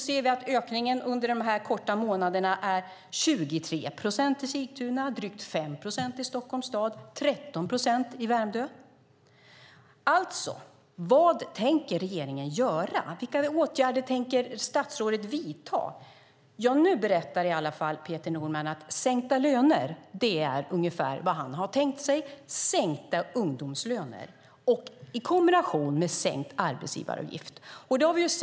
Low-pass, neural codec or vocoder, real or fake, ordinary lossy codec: none; none; real; none